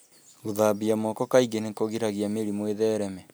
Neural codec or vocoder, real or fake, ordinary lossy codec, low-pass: none; real; none; none